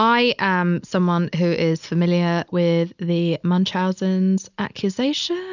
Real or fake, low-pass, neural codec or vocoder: real; 7.2 kHz; none